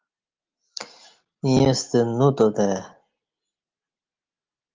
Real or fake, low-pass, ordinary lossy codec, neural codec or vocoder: real; 7.2 kHz; Opus, 32 kbps; none